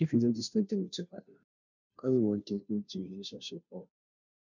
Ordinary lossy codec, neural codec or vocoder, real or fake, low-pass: none; codec, 16 kHz, 0.5 kbps, FunCodec, trained on Chinese and English, 25 frames a second; fake; 7.2 kHz